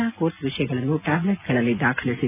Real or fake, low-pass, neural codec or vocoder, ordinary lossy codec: real; 3.6 kHz; none; none